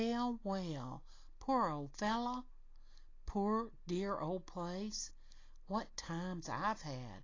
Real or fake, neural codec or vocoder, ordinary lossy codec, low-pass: real; none; AAC, 32 kbps; 7.2 kHz